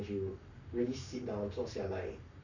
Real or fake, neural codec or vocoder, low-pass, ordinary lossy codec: fake; codec, 44.1 kHz, 7.8 kbps, Pupu-Codec; 7.2 kHz; none